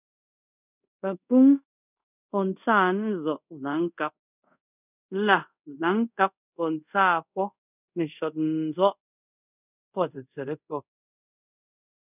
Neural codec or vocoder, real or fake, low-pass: codec, 24 kHz, 0.5 kbps, DualCodec; fake; 3.6 kHz